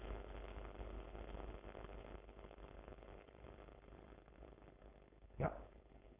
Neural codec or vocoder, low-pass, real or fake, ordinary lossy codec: codec, 16 kHz, 4.8 kbps, FACodec; 3.6 kHz; fake; Opus, 64 kbps